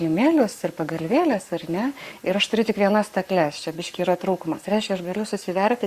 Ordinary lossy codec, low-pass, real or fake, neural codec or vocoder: Opus, 64 kbps; 14.4 kHz; fake; codec, 44.1 kHz, 7.8 kbps, Pupu-Codec